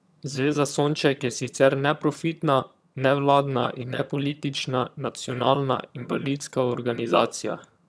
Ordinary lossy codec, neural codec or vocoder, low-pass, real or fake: none; vocoder, 22.05 kHz, 80 mel bands, HiFi-GAN; none; fake